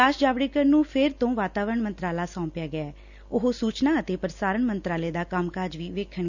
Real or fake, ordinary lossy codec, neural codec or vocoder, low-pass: real; none; none; 7.2 kHz